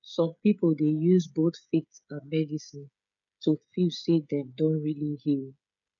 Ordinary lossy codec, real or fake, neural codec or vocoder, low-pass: none; fake; codec, 16 kHz, 16 kbps, FreqCodec, smaller model; 7.2 kHz